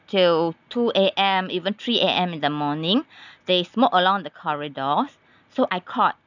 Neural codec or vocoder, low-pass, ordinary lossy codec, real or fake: none; 7.2 kHz; none; real